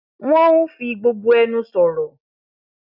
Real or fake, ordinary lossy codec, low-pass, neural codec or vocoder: real; none; 5.4 kHz; none